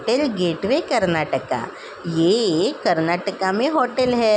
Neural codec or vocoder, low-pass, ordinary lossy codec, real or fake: none; none; none; real